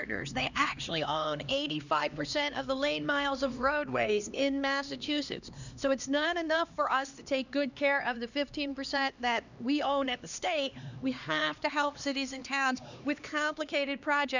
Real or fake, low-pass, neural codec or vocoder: fake; 7.2 kHz; codec, 16 kHz, 2 kbps, X-Codec, HuBERT features, trained on LibriSpeech